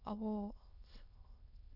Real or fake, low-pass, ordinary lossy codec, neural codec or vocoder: fake; 5.4 kHz; none; autoencoder, 22.05 kHz, a latent of 192 numbers a frame, VITS, trained on many speakers